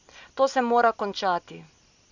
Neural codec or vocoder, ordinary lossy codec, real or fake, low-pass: none; none; real; 7.2 kHz